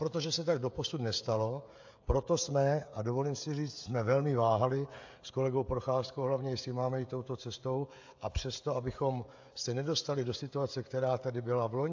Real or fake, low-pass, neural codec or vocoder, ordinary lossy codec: fake; 7.2 kHz; codec, 16 kHz, 16 kbps, FreqCodec, smaller model; AAC, 48 kbps